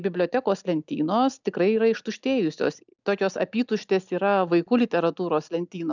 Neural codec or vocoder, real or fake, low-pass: none; real; 7.2 kHz